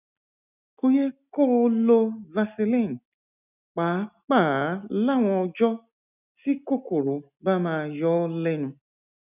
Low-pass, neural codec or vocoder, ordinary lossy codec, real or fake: 3.6 kHz; none; none; real